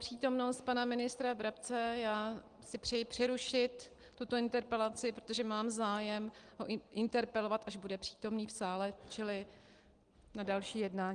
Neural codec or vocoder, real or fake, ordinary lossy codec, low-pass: none; real; Opus, 24 kbps; 9.9 kHz